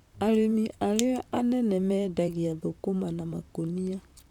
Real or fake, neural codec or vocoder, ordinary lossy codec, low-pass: fake; vocoder, 44.1 kHz, 128 mel bands, Pupu-Vocoder; none; 19.8 kHz